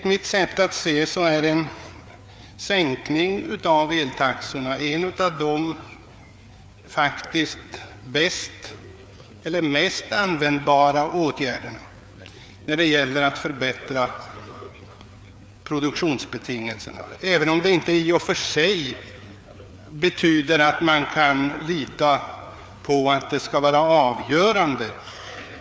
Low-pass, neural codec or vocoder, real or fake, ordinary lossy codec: none; codec, 16 kHz, 4 kbps, FreqCodec, larger model; fake; none